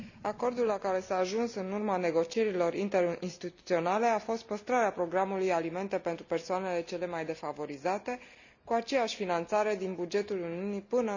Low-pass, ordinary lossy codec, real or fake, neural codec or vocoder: 7.2 kHz; none; real; none